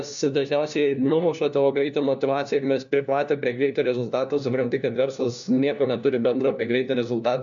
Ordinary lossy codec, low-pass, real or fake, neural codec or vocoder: MP3, 96 kbps; 7.2 kHz; fake; codec, 16 kHz, 1 kbps, FunCodec, trained on LibriTTS, 50 frames a second